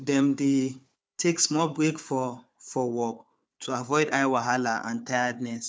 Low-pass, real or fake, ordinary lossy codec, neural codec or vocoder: none; fake; none; codec, 16 kHz, 4 kbps, FunCodec, trained on Chinese and English, 50 frames a second